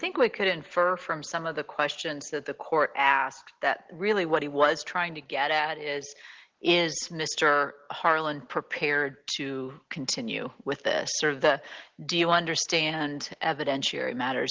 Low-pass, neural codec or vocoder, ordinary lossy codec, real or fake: 7.2 kHz; none; Opus, 16 kbps; real